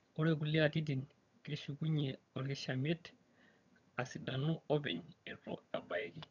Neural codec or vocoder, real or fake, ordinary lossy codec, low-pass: vocoder, 22.05 kHz, 80 mel bands, HiFi-GAN; fake; none; 7.2 kHz